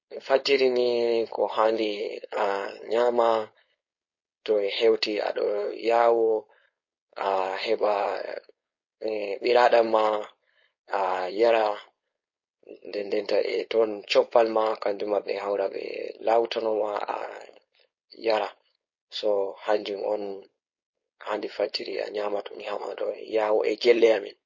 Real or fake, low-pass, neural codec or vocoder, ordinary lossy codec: fake; 7.2 kHz; codec, 16 kHz, 4.8 kbps, FACodec; MP3, 32 kbps